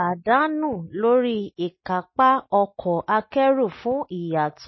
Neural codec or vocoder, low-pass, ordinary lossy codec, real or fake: none; 7.2 kHz; MP3, 24 kbps; real